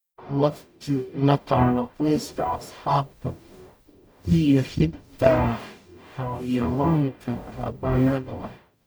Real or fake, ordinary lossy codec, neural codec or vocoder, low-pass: fake; none; codec, 44.1 kHz, 0.9 kbps, DAC; none